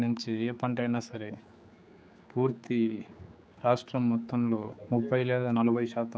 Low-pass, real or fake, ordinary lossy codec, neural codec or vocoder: none; fake; none; codec, 16 kHz, 4 kbps, X-Codec, HuBERT features, trained on general audio